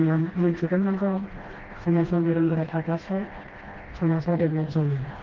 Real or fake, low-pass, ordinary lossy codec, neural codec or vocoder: fake; 7.2 kHz; Opus, 16 kbps; codec, 16 kHz, 1 kbps, FreqCodec, smaller model